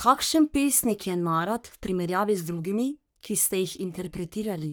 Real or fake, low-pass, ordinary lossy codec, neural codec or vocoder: fake; none; none; codec, 44.1 kHz, 3.4 kbps, Pupu-Codec